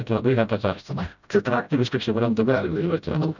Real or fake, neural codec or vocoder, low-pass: fake; codec, 16 kHz, 0.5 kbps, FreqCodec, smaller model; 7.2 kHz